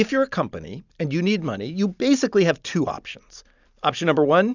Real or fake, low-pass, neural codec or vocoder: real; 7.2 kHz; none